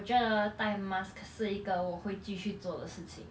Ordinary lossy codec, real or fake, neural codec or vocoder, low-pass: none; real; none; none